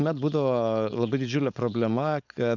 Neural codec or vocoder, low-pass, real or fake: codec, 16 kHz, 4.8 kbps, FACodec; 7.2 kHz; fake